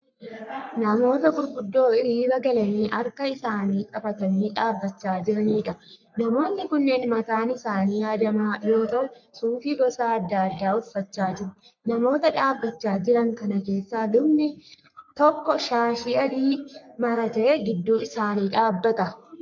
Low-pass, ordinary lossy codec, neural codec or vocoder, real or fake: 7.2 kHz; MP3, 64 kbps; codec, 44.1 kHz, 3.4 kbps, Pupu-Codec; fake